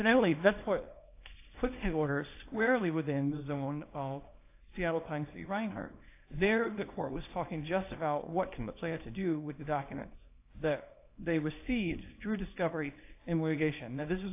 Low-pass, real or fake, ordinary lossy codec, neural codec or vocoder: 3.6 kHz; fake; AAC, 24 kbps; codec, 24 kHz, 0.9 kbps, WavTokenizer, small release